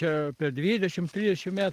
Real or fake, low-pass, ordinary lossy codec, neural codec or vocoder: real; 14.4 kHz; Opus, 24 kbps; none